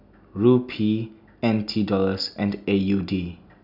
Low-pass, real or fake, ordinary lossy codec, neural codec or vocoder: 5.4 kHz; real; none; none